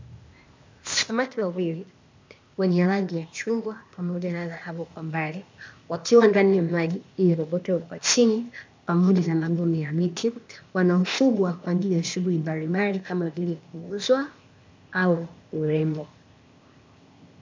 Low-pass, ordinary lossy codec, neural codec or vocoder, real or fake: 7.2 kHz; MP3, 64 kbps; codec, 16 kHz, 0.8 kbps, ZipCodec; fake